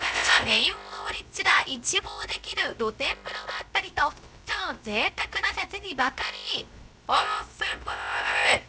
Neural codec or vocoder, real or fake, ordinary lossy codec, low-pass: codec, 16 kHz, 0.3 kbps, FocalCodec; fake; none; none